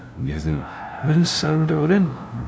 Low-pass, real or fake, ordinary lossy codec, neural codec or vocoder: none; fake; none; codec, 16 kHz, 0.5 kbps, FunCodec, trained on LibriTTS, 25 frames a second